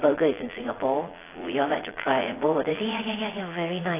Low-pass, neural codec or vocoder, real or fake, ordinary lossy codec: 3.6 kHz; vocoder, 22.05 kHz, 80 mel bands, Vocos; fake; AAC, 16 kbps